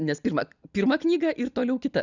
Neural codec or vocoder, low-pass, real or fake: none; 7.2 kHz; real